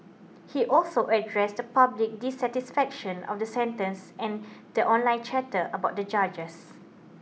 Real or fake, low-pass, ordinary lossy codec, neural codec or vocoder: real; none; none; none